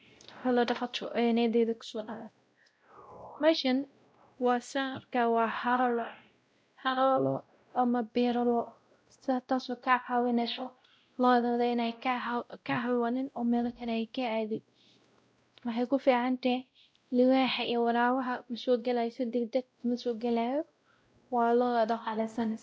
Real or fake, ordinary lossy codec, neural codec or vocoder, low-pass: fake; none; codec, 16 kHz, 0.5 kbps, X-Codec, WavLM features, trained on Multilingual LibriSpeech; none